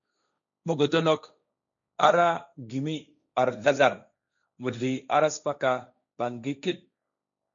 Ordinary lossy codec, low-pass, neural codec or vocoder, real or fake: MP3, 64 kbps; 7.2 kHz; codec, 16 kHz, 1.1 kbps, Voila-Tokenizer; fake